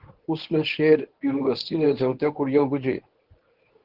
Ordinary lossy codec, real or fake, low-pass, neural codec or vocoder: Opus, 16 kbps; fake; 5.4 kHz; codec, 24 kHz, 0.9 kbps, WavTokenizer, medium speech release version 1